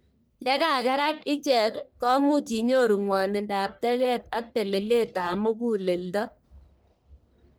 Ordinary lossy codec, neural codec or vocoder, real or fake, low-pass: none; codec, 44.1 kHz, 1.7 kbps, Pupu-Codec; fake; none